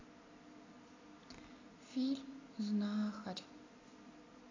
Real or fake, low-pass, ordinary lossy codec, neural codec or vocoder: real; 7.2 kHz; AAC, 32 kbps; none